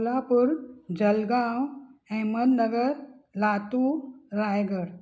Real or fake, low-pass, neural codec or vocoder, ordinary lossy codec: real; none; none; none